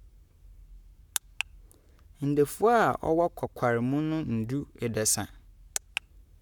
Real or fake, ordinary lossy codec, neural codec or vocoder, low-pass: fake; none; codec, 44.1 kHz, 7.8 kbps, Pupu-Codec; 19.8 kHz